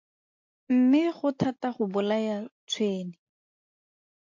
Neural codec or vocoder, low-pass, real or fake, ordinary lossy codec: none; 7.2 kHz; real; MP3, 48 kbps